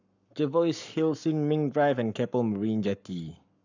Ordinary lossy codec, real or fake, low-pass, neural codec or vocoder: none; fake; 7.2 kHz; codec, 44.1 kHz, 7.8 kbps, Pupu-Codec